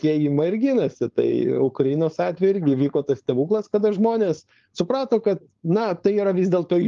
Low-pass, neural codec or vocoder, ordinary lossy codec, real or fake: 7.2 kHz; codec, 16 kHz, 4.8 kbps, FACodec; Opus, 32 kbps; fake